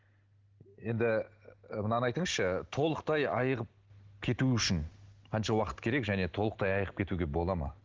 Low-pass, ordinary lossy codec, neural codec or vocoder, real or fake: 7.2 kHz; Opus, 16 kbps; none; real